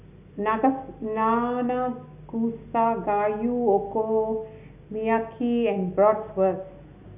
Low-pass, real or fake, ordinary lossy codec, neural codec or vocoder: 3.6 kHz; real; none; none